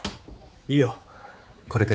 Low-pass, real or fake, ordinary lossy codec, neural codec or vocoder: none; fake; none; codec, 16 kHz, 4 kbps, X-Codec, HuBERT features, trained on general audio